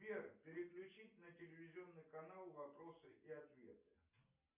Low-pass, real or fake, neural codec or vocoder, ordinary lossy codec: 3.6 kHz; real; none; MP3, 32 kbps